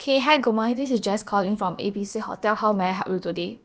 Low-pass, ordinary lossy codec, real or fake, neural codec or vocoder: none; none; fake; codec, 16 kHz, about 1 kbps, DyCAST, with the encoder's durations